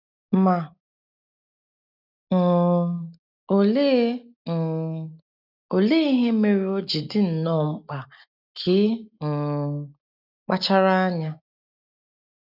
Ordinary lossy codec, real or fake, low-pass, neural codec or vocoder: none; real; 5.4 kHz; none